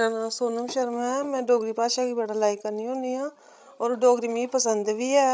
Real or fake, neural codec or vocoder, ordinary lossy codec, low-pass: fake; codec, 16 kHz, 16 kbps, FreqCodec, larger model; none; none